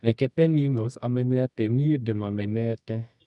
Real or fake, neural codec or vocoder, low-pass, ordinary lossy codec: fake; codec, 24 kHz, 0.9 kbps, WavTokenizer, medium music audio release; 10.8 kHz; none